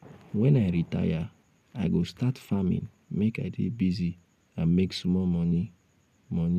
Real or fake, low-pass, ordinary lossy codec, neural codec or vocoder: real; 14.4 kHz; none; none